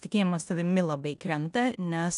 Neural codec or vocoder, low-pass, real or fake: codec, 16 kHz in and 24 kHz out, 0.9 kbps, LongCat-Audio-Codec, four codebook decoder; 10.8 kHz; fake